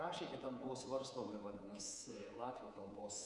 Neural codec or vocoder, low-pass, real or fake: codec, 24 kHz, 3.1 kbps, DualCodec; 10.8 kHz; fake